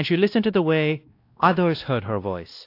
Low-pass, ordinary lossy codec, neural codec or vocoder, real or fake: 5.4 kHz; AAC, 32 kbps; codec, 16 kHz, 1 kbps, X-Codec, WavLM features, trained on Multilingual LibriSpeech; fake